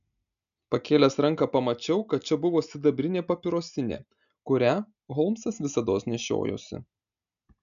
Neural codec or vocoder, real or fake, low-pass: none; real; 7.2 kHz